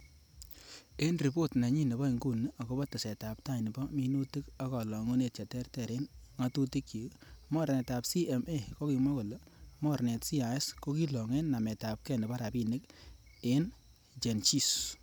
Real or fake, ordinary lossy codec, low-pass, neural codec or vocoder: real; none; none; none